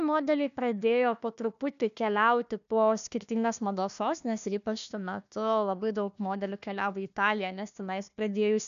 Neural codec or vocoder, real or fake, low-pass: codec, 16 kHz, 1 kbps, FunCodec, trained on Chinese and English, 50 frames a second; fake; 7.2 kHz